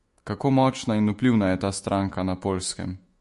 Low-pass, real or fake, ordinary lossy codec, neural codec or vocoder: 14.4 kHz; fake; MP3, 48 kbps; autoencoder, 48 kHz, 128 numbers a frame, DAC-VAE, trained on Japanese speech